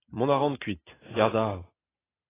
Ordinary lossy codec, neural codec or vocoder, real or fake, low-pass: AAC, 16 kbps; none; real; 3.6 kHz